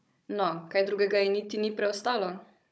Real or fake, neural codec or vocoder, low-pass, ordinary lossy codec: fake; codec, 16 kHz, 16 kbps, FunCodec, trained on Chinese and English, 50 frames a second; none; none